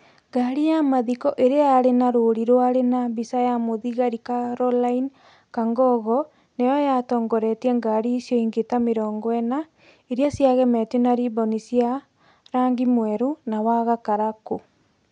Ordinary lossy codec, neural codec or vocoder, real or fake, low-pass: none; none; real; 9.9 kHz